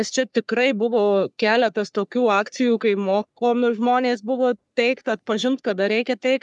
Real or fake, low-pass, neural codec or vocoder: fake; 10.8 kHz; codec, 44.1 kHz, 3.4 kbps, Pupu-Codec